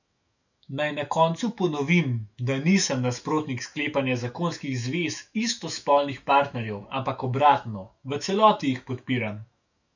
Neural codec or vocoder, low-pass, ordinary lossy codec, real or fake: autoencoder, 48 kHz, 128 numbers a frame, DAC-VAE, trained on Japanese speech; 7.2 kHz; none; fake